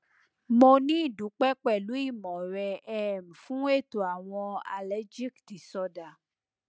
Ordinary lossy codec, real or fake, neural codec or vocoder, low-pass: none; real; none; none